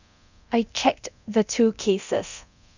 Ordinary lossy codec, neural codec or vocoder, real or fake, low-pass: none; codec, 24 kHz, 0.9 kbps, DualCodec; fake; 7.2 kHz